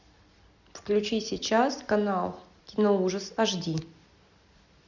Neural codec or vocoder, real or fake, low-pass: none; real; 7.2 kHz